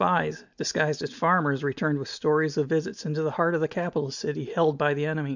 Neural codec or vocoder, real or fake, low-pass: none; real; 7.2 kHz